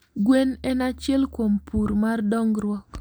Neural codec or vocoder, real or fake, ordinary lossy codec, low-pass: none; real; none; none